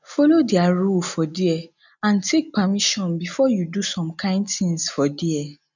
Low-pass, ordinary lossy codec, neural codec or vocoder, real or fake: 7.2 kHz; none; none; real